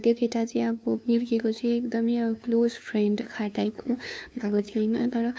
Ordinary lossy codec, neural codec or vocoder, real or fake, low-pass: none; codec, 16 kHz, 2 kbps, FunCodec, trained on LibriTTS, 25 frames a second; fake; none